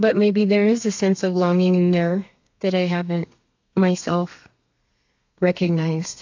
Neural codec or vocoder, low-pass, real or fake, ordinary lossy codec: codec, 32 kHz, 1.9 kbps, SNAC; 7.2 kHz; fake; AAC, 48 kbps